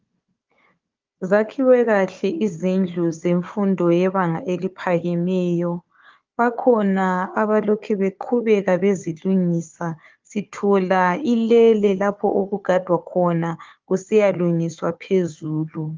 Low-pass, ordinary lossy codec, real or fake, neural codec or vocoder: 7.2 kHz; Opus, 32 kbps; fake; codec, 16 kHz, 4 kbps, FunCodec, trained on Chinese and English, 50 frames a second